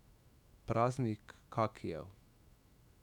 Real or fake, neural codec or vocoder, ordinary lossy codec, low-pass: fake; autoencoder, 48 kHz, 128 numbers a frame, DAC-VAE, trained on Japanese speech; none; 19.8 kHz